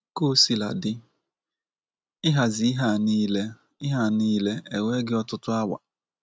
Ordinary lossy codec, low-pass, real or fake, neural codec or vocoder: none; none; real; none